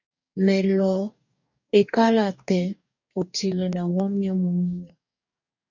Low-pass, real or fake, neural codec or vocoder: 7.2 kHz; fake; codec, 44.1 kHz, 2.6 kbps, DAC